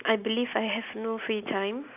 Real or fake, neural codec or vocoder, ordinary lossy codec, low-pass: real; none; none; 3.6 kHz